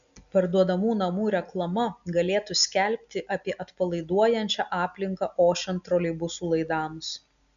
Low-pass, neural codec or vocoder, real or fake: 7.2 kHz; none; real